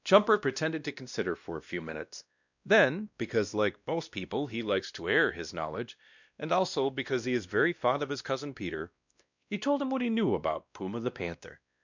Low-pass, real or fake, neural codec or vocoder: 7.2 kHz; fake; codec, 16 kHz, 1 kbps, X-Codec, WavLM features, trained on Multilingual LibriSpeech